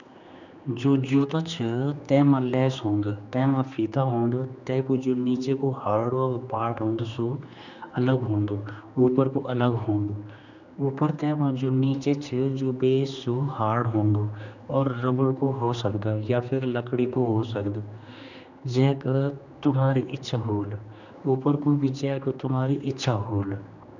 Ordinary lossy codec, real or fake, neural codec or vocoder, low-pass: none; fake; codec, 16 kHz, 2 kbps, X-Codec, HuBERT features, trained on general audio; 7.2 kHz